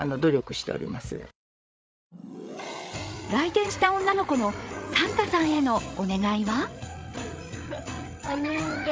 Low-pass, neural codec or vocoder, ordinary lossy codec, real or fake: none; codec, 16 kHz, 8 kbps, FreqCodec, larger model; none; fake